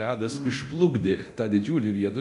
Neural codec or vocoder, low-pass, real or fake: codec, 24 kHz, 0.9 kbps, DualCodec; 10.8 kHz; fake